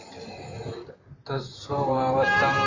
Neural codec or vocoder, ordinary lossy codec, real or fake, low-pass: vocoder, 44.1 kHz, 128 mel bands, Pupu-Vocoder; AAC, 32 kbps; fake; 7.2 kHz